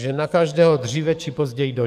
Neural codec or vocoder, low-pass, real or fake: codec, 44.1 kHz, 7.8 kbps, DAC; 14.4 kHz; fake